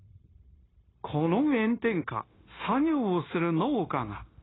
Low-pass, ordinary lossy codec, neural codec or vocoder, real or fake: 7.2 kHz; AAC, 16 kbps; codec, 16 kHz, 0.9 kbps, LongCat-Audio-Codec; fake